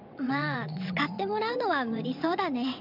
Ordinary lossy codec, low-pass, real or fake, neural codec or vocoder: none; 5.4 kHz; fake; vocoder, 22.05 kHz, 80 mel bands, WaveNeXt